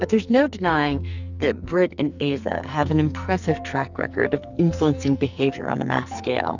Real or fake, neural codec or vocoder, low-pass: fake; codec, 44.1 kHz, 2.6 kbps, SNAC; 7.2 kHz